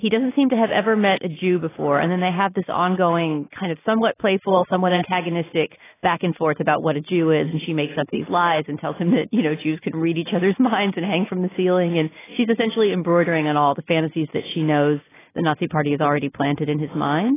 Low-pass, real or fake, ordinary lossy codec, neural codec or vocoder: 3.6 kHz; real; AAC, 16 kbps; none